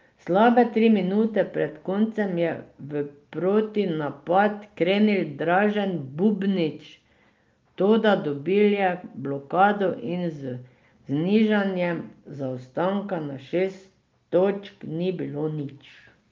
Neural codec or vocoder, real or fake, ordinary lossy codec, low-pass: none; real; Opus, 24 kbps; 7.2 kHz